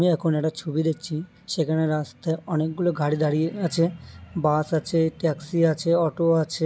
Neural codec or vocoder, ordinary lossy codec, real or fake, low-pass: none; none; real; none